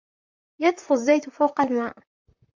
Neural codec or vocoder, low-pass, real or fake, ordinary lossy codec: vocoder, 44.1 kHz, 128 mel bands, Pupu-Vocoder; 7.2 kHz; fake; MP3, 64 kbps